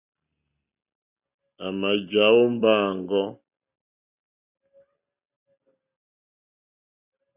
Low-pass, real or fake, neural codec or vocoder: 3.6 kHz; real; none